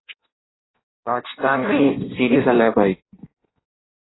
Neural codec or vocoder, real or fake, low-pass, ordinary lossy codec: codec, 16 kHz in and 24 kHz out, 0.6 kbps, FireRedTTS-2 codec; fake; 7.2 kHz; AAC, 16 kbps